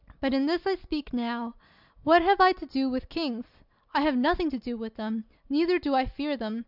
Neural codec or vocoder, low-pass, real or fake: none; 5.4 kHz; real